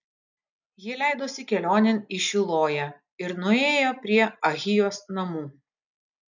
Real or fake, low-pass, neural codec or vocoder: real; 7.2 kHz; none